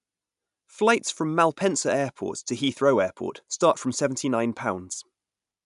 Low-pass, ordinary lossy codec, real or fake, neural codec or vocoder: 10.8 kHz; none; real; none